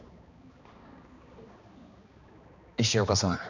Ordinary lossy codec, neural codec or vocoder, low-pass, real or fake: none; codec, 16 kHz, 2 kbps, X-Codec, HuBERT features, trained on balanced general audio; 7.2 kHz; fake